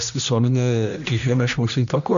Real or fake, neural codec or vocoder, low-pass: fake; codec, 16 kHz, 1 kbps, X-Codec, HuBERT features, trained on general audio; 7.2 kHz